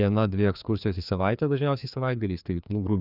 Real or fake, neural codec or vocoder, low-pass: fake; codec, 16 kHz, 2 kbps, FreqCodec, larger model; 5.4 kHz